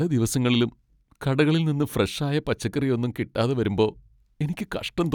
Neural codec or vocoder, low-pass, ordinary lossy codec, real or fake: none; 19.8 kHz; none; real